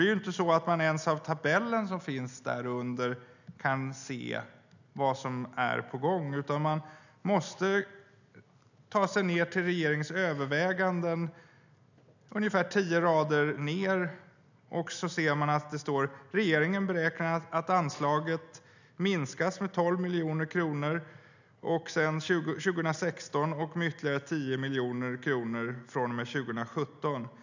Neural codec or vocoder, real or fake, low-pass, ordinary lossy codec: none; real; 7.2 kHz; none